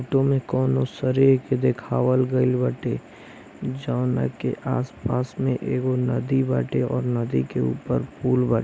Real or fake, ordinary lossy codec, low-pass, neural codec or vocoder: real; none; none; none